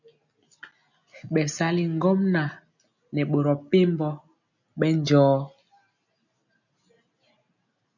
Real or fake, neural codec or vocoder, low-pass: real; none; 7.2 kHz